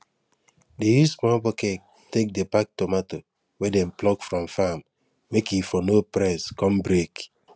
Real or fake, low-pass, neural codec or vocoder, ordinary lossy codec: real; none; none; none